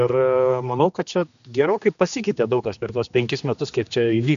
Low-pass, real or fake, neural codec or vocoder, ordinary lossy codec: 7.2 kHz; fake; codec, 16 kHz, 2 kbps, X-Codec, HuBERT features, trained on general audio; AAC, 96 kbps